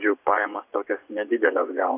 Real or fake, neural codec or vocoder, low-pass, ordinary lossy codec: fake; vocoder, 24 kHz, 100 mel bands, Vocos; 3.6 kHz; AAC, 32 kbps